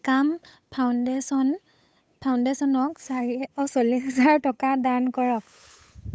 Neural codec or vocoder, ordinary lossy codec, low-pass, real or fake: codec, 16 kHz, 8 kbps, FunCodec, trained on Chinese and English, 25 frames a second; none; none; fake